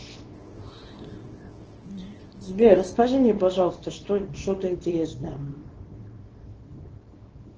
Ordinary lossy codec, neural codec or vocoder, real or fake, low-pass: Opus, 16 kbps; codec, 24 kHz, 0.9 kbps, WavTokenizer, small release; fake; 7.2 kHz